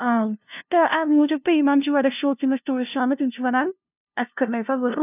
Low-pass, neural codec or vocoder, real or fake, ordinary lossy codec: 3.6 kHz; codec, 16 kHz, 0.5 kbps, FunCodec, trained on LibriTTS, 25 frames a second; fake; none